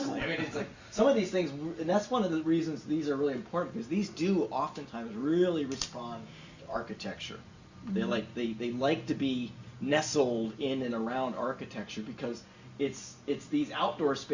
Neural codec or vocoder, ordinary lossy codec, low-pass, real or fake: none; Opus, 64 kbps; 7.2 kHz; real